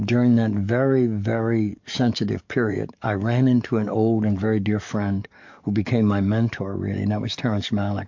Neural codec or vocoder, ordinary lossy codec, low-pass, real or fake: codec, 44.1 kHz, 7.8 kbps, DAC; MP3, 48 kbps; 7.2 kHz; fake